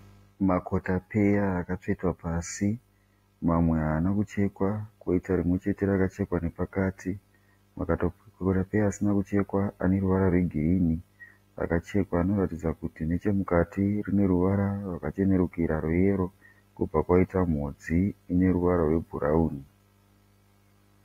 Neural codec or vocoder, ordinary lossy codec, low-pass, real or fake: none; AAC, 48 kbps; 19.8 kHz; real